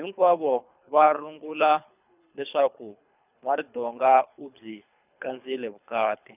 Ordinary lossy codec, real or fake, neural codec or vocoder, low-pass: none; fake; codec, 24 kHz, 3 kbps, HILCodec; 3.6 kHz